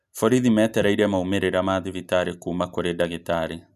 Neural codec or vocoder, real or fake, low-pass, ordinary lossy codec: none; real; 14.4 kHz; none